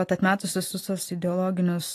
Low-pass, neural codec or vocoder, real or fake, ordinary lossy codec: 14.4 kHz; none; real; AAC, 48 kbps